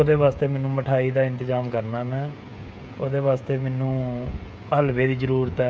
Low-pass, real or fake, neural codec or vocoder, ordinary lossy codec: none; fake; codec, 16 kHz, 16 kbps, FreqCodec, smaller model; none